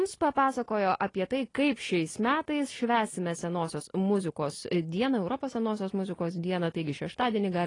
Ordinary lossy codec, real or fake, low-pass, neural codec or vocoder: AAC, 32 kbps; real; 10.8 kHz; none